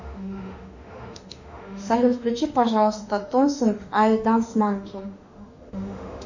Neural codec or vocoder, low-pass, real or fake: autoencoder, 48 kHz, 32 numbers a frame, DAC-VAE, trained on Japanese speech; 7.2 kHz; fake